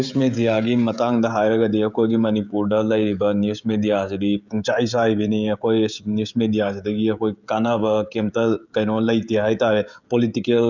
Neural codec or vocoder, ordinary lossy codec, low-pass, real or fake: codec, 16 kHz, 16 kbps, FreqCodec, smaller model; none; 7.2 kHz; fake